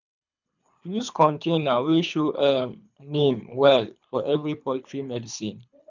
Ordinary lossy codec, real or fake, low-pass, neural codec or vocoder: none; fake; 7.2 kHz; codec, 24 kHz, 3 kbps, HILCodec